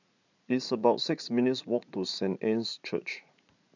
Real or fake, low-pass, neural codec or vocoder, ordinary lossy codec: fake; 7.2 kHz; vocoder, 44.1 kHz, 80 mel bands, Vocos; none